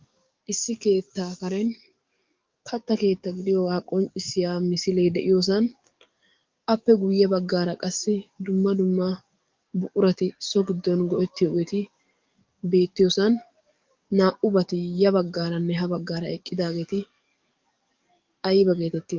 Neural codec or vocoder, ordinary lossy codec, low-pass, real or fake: codec, 44.1 kHz, 7.8 kbps, DAC; Opus, 24 kbps; 7.2 kHz; fake